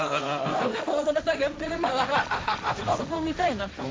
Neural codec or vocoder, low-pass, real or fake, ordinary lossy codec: codec, 16 kHz, 1.1 kbps, Voila-Tokenizer; none; fake; none